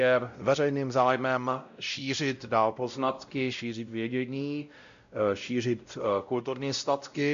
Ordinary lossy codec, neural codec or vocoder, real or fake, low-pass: MP3, 64 kbps; codec, 16 kHz, 0.5 kbps, X-Codec, WavLM features, trained on Multilingual LibriSpeech; fake; 7.2 kHz